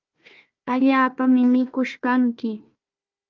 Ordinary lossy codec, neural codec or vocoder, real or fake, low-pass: Opus, 24 kbps; codec, 16 kHz, 1 kbps, FunCodec, trained on Chinese and English, 50 frames a second; fake; 7.2 kHz